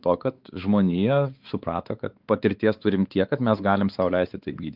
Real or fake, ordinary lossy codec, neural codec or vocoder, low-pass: fake; Opus, 32 kbps; codec, 16 kHz, 4 kbps, X-Codec, WavLM features, trained on Multilingual LibriSpeech; 5.4 kHz